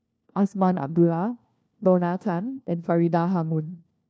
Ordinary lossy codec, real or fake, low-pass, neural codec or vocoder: none; fake; none; codec, 16 kHz, 1 kbps, FunCodec, trained on LibriTTS, 50 frames a second